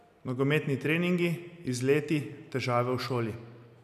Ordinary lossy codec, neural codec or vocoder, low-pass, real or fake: none; none; 14.4 kHz; real